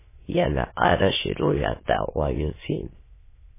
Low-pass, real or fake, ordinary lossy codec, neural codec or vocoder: 3.6 kHz; fake; MP3, 16 kbps; autoencoder, 22.05 kHz, a latent of 192 numbers a frame, VITS, trained on many speakers